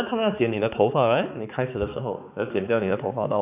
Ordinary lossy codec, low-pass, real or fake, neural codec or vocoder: none; 3.6 kHz; fake; codec, 16 kHz, 4 kbps, X-Codec, HuBERT features, trained on balanced general audio